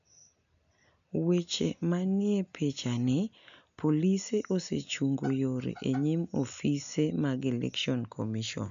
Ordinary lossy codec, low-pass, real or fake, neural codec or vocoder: none; 7.2 kHz; real; none